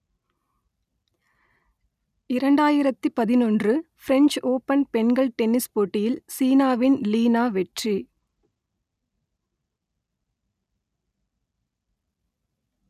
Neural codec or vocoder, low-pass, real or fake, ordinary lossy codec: none; 14.4 kHz; real; none